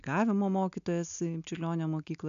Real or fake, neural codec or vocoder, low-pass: real; none; 7.2 kHz